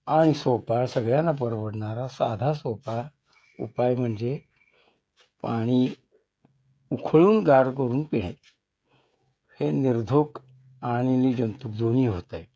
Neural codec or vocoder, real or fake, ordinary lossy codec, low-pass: codec, 16 kHz, 16 kbps, FreqCodec, smaller model; fake; none; none